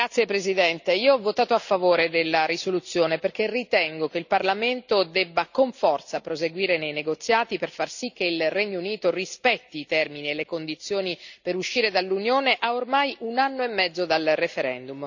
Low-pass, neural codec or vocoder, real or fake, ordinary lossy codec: 7.2 kHz; none; real; none